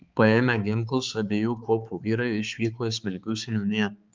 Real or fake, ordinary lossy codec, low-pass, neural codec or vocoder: fake; Opus, 24 kbps; 7.2 kHz; codec, 16 kHz, 4 kbps, X-Codec, HuBERT features, trained on balanced general audio